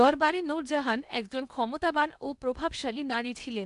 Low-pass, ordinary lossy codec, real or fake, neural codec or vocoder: 10.8 kHz; none; fake; codec, 16 kHz in and 24 kHz out, 0.8 kbps, FocalCodec, streaming, 65536 codes